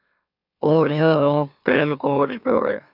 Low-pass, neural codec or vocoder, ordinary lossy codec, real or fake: 5.4 kHz; autoencoder, 44.1 kHz, a latent of 192 numbers a frame, MeloTTS; AAC, 48 kbps; fake